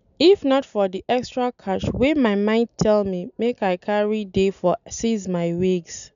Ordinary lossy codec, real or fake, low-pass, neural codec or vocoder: none; real; 7.2 kHz; none